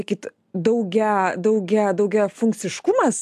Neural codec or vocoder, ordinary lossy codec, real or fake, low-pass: none; AAC, 96 kbps; real; 14.4 kHz